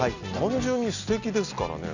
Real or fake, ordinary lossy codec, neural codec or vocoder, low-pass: real; none; none; 7.2 kHz